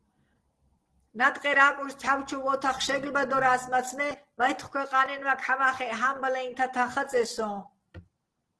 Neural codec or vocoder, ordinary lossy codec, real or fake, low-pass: none; Opus, 16 kbps; real; 10.8 kHz